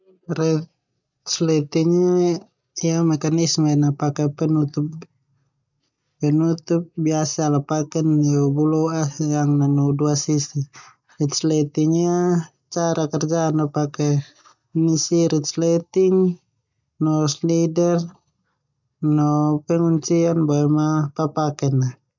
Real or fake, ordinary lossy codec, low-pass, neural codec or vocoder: real; none; 7.2 kHz; none